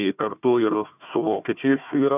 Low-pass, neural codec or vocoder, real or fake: 3.6 kHz; codec, 16 kHz, 1 kbps, FunCodec, trained on Chinese and English, 50 frames a second; fake